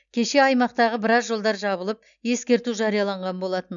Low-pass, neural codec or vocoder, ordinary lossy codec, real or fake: 7.2 kHz; none; none; real